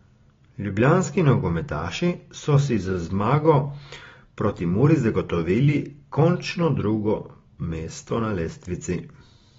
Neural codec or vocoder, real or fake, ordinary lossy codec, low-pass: none; real; AAC, 24 kbps; 7.2 kHz